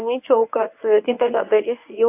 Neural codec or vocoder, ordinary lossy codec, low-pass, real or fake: codec, 24 kHz, 0.9 kbps, WavTokenizer, medium speech release version 1; AAC, 32 kbps; 3.6 kHz; fake